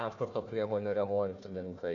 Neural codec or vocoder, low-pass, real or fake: codec, 16 kHz, 1 kbps, FunCodec, trained on Chinese and English, 50 frames a second; 7.2 kHz; fake